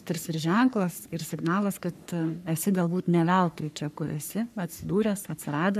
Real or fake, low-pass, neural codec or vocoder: fake; 14.4 kHz; codec, 44.1 kHz, 3.4 kbps, Pupu-Codec